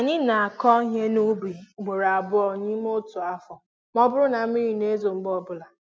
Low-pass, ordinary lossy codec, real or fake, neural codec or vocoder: none; none; real; none